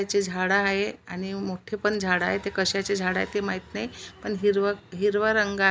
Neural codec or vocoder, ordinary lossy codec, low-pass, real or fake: none; none; none; real